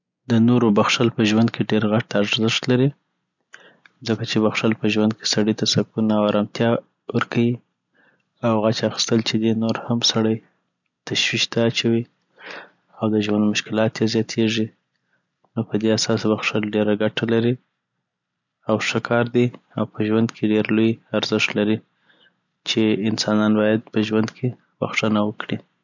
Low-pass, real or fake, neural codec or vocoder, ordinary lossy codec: 7.2 kHz; real; none; none